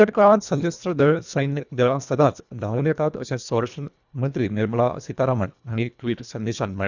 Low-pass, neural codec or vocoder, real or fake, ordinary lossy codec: 7.2 kHz; codec, 24 kHz, 1.5 kbps, HILCodec; fake; none